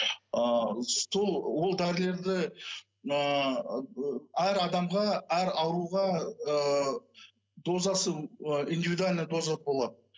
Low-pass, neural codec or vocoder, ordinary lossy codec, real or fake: none; none; none; real